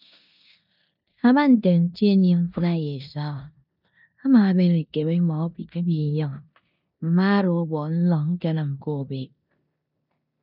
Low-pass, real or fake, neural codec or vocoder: 5.4 kHz; fake; codec, 16 kHz in and 24 kHz out, 0.9 kbps, LongCat-Audio-Codec, four codebook decoder